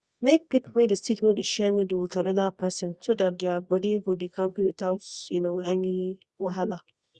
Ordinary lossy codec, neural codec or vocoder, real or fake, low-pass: Opus, 64 kbps; codec, 24 kHz, 0.9 kbps, WavTokenizer, medium music audio release; fake; 10.8 kHz